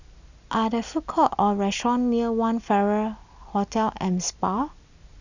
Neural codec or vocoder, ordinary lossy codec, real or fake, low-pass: none; none; real; 7.2 kHz